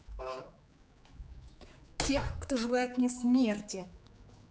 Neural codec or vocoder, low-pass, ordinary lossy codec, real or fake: codec, 16 kHz, 4 kbps, X-Codec, HuBERT features, trained on general audio; none; none; fake